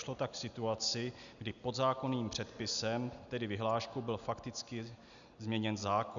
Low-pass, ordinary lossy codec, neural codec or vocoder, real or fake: 7.2 kHz; MP3, 96 kbps; none; real